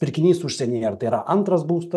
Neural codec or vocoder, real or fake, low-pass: none; real; 14.4 kHz